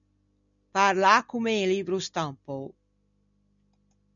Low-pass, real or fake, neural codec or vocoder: 7.2 kHz; real; none